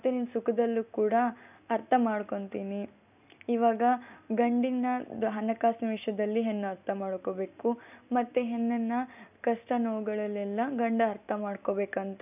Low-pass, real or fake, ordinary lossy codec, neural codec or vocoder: 3.6 kHz; real; none; none